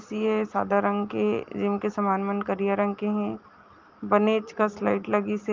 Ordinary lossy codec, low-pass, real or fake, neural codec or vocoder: Opus, 32 kbps; 7.2 kHz; real; none